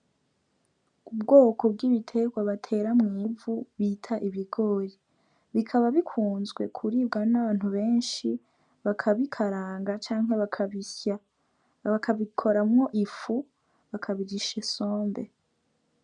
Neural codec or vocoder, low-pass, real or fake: none; 9.9 kHz; real